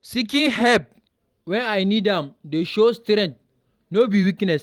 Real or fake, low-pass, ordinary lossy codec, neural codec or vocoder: fake; 19.8 kHz; Opus, 32 kbps; vocoder, 44.1 kHz, 128 mel bands every 512 samples, BigVGAN v2